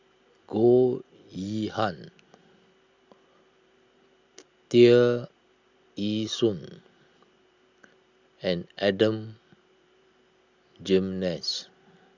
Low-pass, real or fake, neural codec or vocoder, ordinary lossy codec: 7.2 kHz; real; none; Opus, 64 kbps